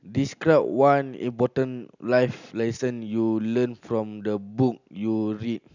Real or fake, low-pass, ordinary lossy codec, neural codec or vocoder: real; 7.2 kHz; none; none